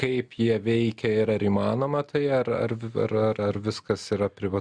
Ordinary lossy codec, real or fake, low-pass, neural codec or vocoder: Opus, 64 kbps; real; 9.9 kHz; none